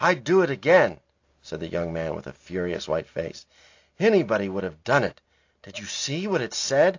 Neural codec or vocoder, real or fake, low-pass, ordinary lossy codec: none; real; 7.2 kHz; AAC, 48 kbps